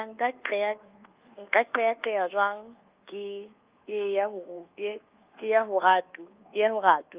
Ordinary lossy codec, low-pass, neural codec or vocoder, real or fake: Opus, 32 kbps; 3.6 kHz; codec, 24 kHz, 1.2 kbps, DualCodec; fake